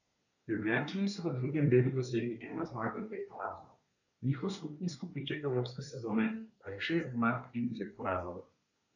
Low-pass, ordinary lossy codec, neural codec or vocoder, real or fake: 7.2 kHz; none; codec, 24 kHz, 1 kbps, SNAC; fake